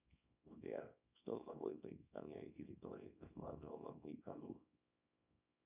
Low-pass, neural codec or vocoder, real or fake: 3.6 kHz; codec, 24 kHz, 0.9 kbps, WavTokenizer, small release; fake